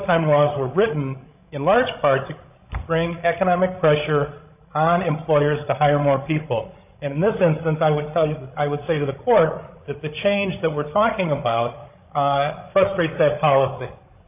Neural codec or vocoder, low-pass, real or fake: codec, 16 kHz, 16 kbps, FreqCodec, larger model; 3.6 kHz; fake